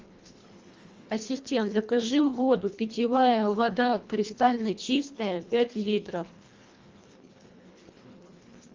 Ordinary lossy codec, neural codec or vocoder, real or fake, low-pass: Opus, 32 kbps; codec, 24 kHz, 1.5 kbps, HILCodec; fake; 7.2 kHz